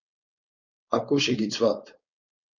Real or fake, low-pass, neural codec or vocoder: fake; 7.2 kHz; codec, 16 kHz, 4.8 kbps, FACodec